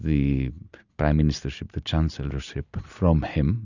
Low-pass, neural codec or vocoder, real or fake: 7.2 kHz; none; real